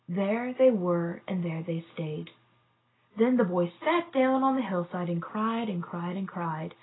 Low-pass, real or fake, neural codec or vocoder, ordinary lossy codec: 7.2 kHz; real; none; AAC, 16 kbps